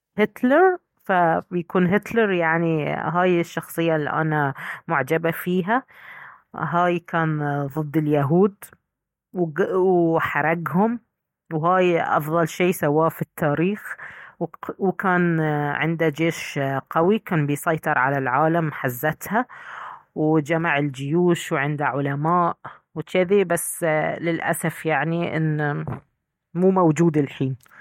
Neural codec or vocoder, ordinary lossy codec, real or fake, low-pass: none; MP3, 64 kbps; real; 19.8 kHz